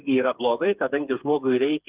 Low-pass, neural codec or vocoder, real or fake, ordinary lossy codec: 3.6 kHz; codec, 16 kHz, 4 kbps, FreqCodec, smaller model; fake; Opus, 24 kbps